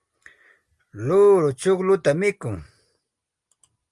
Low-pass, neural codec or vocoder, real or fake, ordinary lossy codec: 10.8 kHz; none; real; Opus, 32 kbps